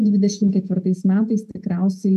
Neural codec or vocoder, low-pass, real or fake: vocoder, 48 kHz, 128 mel bands, Vocos; 14.4 kHz; fake